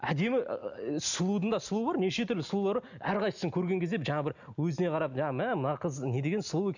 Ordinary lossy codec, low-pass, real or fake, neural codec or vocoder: none; 7.2 kHz; real; none